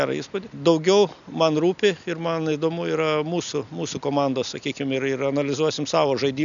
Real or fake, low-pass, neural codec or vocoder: real; 7.2 kHz; none